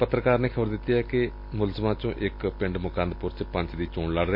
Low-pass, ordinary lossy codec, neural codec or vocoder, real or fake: 5.4 kHz; MP3, 32 kbps; none; real